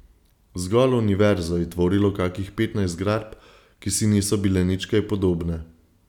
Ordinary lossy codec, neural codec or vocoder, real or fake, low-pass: none; none; real; 19.8 kHz